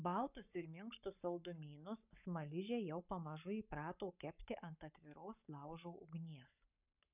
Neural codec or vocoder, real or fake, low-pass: codec, 44.1 kHz, 7.8 kbps, Pupu-Codec; fake; 3.6 kHz